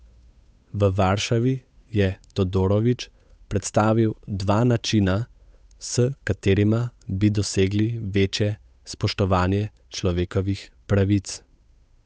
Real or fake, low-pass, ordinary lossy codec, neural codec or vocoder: fake; none; none; codec, 16 kHz, 8 kbps, FunCodec, trained on Chinese and English, 25 frames a second